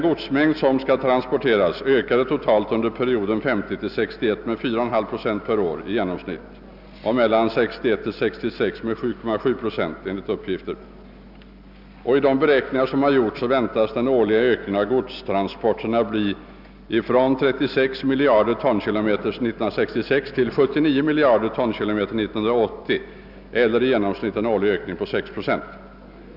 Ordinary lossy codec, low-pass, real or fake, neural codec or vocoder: none; 5.4 kHz; real; none